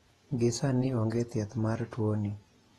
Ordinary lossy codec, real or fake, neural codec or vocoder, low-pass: AAC, 32 kbps; fake; vocoder, 48 kHz, 128 mel bands, Vocos; 19.8 kHz